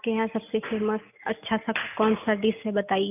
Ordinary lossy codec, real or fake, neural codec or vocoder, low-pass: none; real; none; 3.6 kHz